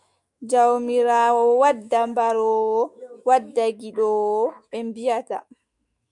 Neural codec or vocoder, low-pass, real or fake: autoencoder, 48 kHz, 128 numbers a frame, DAC-VAE, trained on Japanese speech; 10.8 kHz; fake